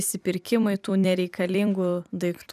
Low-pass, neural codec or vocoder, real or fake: 14.4 kHz; vocoder, 44.1 kHz, 128 mel bands every 256 samples, BigVGAN v2; fake